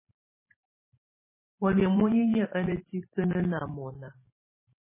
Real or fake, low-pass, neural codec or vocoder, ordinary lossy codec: fake; 3.6 kHz; vocoder, 44.1 kHz, 128 mel bands every 512 samples, BigVGAN v2; MP3, 16 kbps